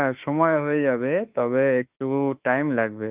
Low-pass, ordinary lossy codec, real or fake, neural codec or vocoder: 3.6 kHz; Opus, 32 kbps; fake; autoencoder, 48 kHz, 32 numbers a frame, DAC-VAE, trained on Japanese speech